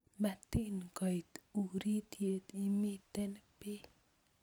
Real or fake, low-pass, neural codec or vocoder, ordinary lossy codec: real; none; none; none